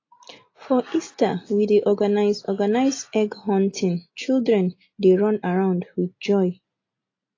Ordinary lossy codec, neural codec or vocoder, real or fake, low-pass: AAC, 32 kbps; none; real; 7.2 kHz